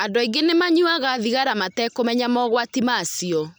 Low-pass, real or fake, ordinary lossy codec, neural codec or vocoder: none; real; none; none